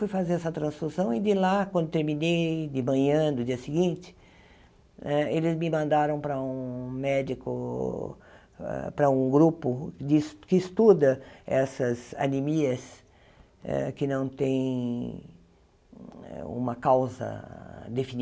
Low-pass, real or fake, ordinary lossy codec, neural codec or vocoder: none; real; none; none